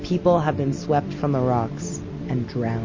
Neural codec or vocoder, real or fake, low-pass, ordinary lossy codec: none; real; 7.2 kHz; MP3, 32 kbps